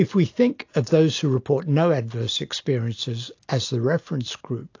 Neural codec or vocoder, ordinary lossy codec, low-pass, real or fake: none; AAC, 48 kbps; 7.2 kHz; real